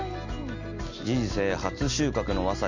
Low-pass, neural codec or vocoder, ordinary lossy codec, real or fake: 7.2 kHz; none; Opus, 64 kbps; real